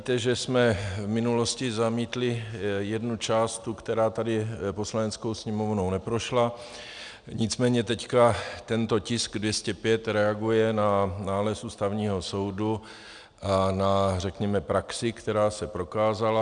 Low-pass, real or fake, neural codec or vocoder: 9.9 kHz; real; none